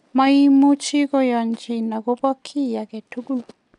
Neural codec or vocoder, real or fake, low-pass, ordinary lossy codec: none; real; 10.8 kHz; none